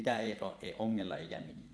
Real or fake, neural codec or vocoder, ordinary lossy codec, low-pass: fake; vocoder, 22.05 kHz, 80 mel bands, Vocos; none; none